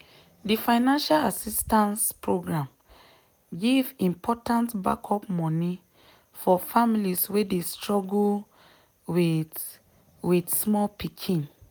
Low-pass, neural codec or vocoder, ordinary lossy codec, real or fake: none; none; none; real